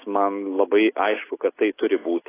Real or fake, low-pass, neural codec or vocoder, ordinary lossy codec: real; 3.6 kHz; none; AAC, 16 kbps